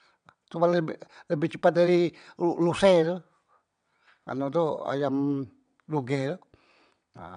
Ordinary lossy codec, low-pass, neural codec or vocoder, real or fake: none; 9.9 kHz; vocoder, 22.05 kHz, 80 mel bands, WaveNeXt; fake